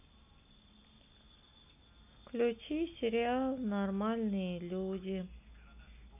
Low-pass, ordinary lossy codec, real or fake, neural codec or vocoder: 3.6 kHz; none; real; none